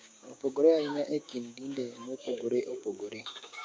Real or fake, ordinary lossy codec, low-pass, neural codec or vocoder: fake; none; none; codec, 16 kHz, 6 kbps, DAC